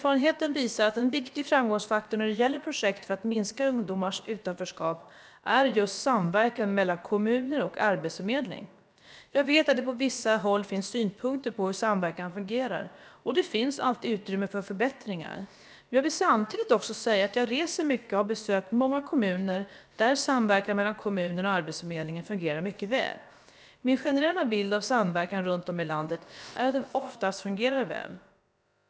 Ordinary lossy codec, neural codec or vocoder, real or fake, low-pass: none; codec, 16 kHz, about 1 kbps, DyCAST, with the encoder's durations; fake; none